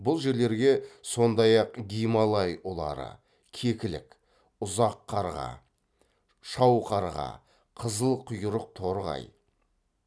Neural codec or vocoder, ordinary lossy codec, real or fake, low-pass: none; none; real; none